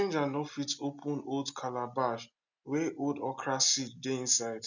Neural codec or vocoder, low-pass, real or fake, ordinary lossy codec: none; 7.2 kHz; real; none